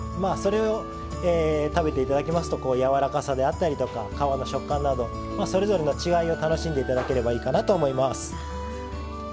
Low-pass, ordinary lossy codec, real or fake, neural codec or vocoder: none; none; real; none